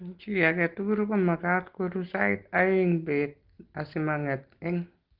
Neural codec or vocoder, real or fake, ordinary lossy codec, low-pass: none; real; Opus, 16 kbps; 5.4 kHz